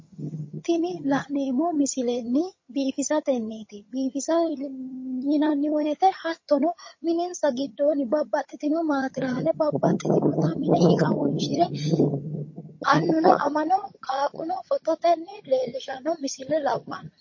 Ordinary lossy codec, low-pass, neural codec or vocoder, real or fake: MP3, 32 kbps; 7.2 kHz; vocoder, 22.05 kHz, 80 mel bands, HiFi-GAN; fake